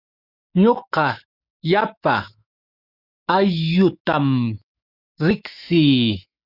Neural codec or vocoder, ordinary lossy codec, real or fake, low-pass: autoencoder, 48 kHz, 128 numbers a frame, DAC-VAE, trained on Japanese speech; Opus, 64 kbps; fake; 5.4 kHz